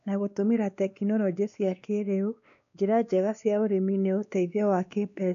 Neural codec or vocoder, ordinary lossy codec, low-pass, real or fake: codec, 16 kHz, 2 kbps, X-Codec, WavLM features, trained on Multilingual LibriSpeech; none; 7.2 kHz; fake